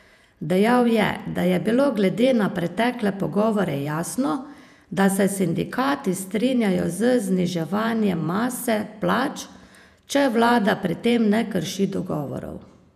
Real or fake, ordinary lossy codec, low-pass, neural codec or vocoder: fake; none; 14.4 kHz; vocoder, 48 kHz, 128 mel bands, Vocos